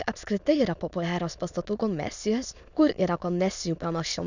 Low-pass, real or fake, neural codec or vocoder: 7.2 kHz; fake; autoencoder, 22.05 kHz, a latent of 192 numbers a frame, VITS, trained on many speakers